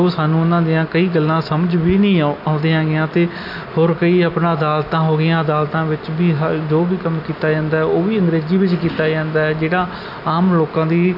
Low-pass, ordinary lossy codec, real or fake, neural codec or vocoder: 5.4 kHz; none; real; none